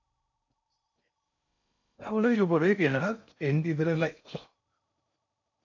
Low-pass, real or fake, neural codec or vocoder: 7.2 kHz; fake; codec, 16 kHz in and 24 kHz out, 0.6 kbps, FocalCodec, streaming, 4096 codes